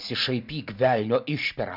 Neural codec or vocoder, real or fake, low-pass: none; real; 5.4 kHz